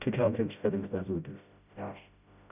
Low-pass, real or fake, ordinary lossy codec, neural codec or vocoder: 3.6 kHz; fake; none; codec, 16 kHz, 0.5 kbps, FreqCodec, smaller model